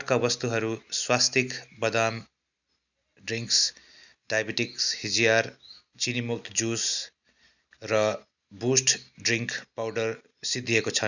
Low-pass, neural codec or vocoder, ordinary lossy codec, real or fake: 7.2 kHz; none; none; real